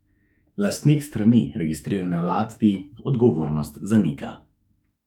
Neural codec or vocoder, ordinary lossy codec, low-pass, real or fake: autoencoder, 48 kHz, 32 numbers a frame, DAC-VAE, trained on Japanese speech; none; 19.8 kHz; fake